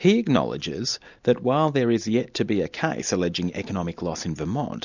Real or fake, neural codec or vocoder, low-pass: real; none; 7.2 kHz